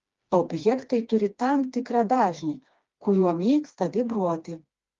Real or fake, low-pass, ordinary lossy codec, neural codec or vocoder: fake; 7.2 kHz; Opus, 32 kbps; codec, 16 kHz, 2 kbps, FreqCodec, smaller model